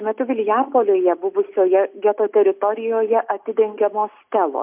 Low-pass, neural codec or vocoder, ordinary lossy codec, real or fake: 3.6 kHz; none; MP3, 32 kbps; real